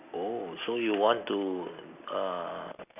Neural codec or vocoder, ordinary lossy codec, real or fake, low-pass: none; none; real; 3.6 kHz